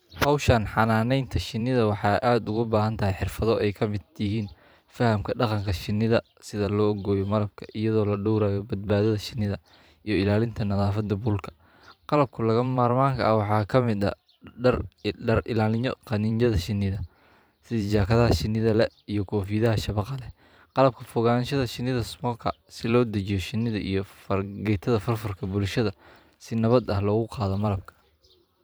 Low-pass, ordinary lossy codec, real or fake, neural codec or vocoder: none; none; fake; vocoder, 44.1 kHz, 128 mel bands every 512 samples, BigVGAN v2